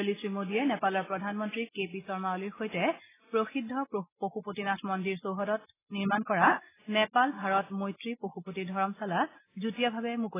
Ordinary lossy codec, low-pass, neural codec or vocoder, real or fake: AAC, 16 kbps; 3.6 kHz; none; real